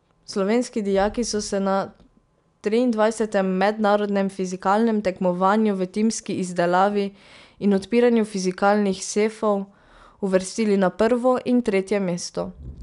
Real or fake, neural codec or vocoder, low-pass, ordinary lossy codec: real; none; 10.8 kHz; none